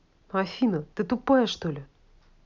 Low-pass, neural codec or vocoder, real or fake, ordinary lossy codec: 7.2 kHz; none; real; none